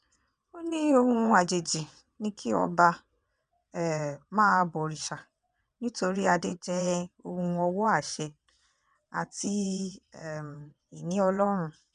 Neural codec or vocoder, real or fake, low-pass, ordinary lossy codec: vocoder, 22.05 kHz, 80 mel bands, WaveNeXt; fake; 9.9 kHz; none